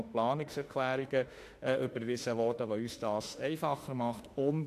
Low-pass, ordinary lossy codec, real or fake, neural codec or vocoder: 14.4 kHz; AAC, 64 kbps; fake; autoencoder, 48 kHz, 32 numbers a frame, DAC-VAE, trained on Japanese speech